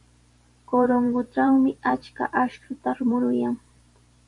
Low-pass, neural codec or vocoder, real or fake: 10.8 kHz; vocoder, 44.1 kHz, 128 mel bands every 512 samples, BigVGAN v2; fake